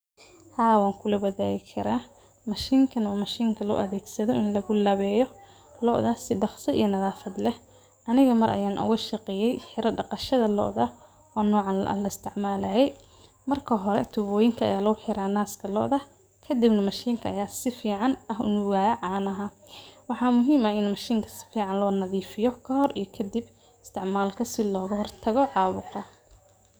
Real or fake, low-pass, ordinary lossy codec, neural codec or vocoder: fake; none; none; codec, 44.1 kHz, 7.8 kbps, DAC